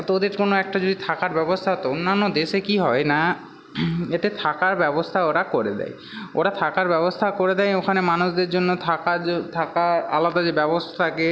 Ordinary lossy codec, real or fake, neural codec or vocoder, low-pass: none; real; none; none